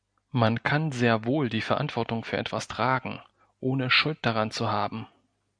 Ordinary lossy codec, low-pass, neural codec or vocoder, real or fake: MP3, 96 kbps; 9.9 kHz; none; real